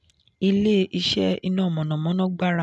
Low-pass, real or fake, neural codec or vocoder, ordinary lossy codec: none; real; none; none